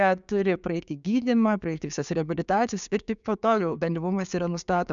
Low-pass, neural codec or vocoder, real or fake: 7.2 kHz; codec, 16 kHz, 6 kbps, DAC; fake